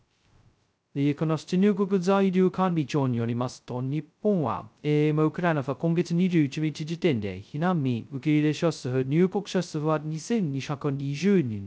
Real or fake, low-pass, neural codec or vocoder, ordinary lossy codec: fake; none; codec, 16 kHz, 0.2 kbps, FocalCodec; none